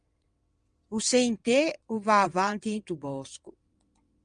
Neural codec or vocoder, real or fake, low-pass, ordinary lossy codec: vocoder, 22.05 kHz, 80 mel bands, WaveNeXt; fake; 9.9 kHz; Opus, 32 kbps